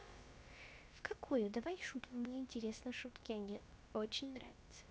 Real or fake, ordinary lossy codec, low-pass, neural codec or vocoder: fake; none; none; codec, 16 kHz, about 1 kbps, DyCAST, with the encoder's durations